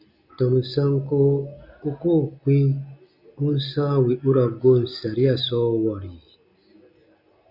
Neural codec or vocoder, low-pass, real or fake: none; 5.4 kHz; real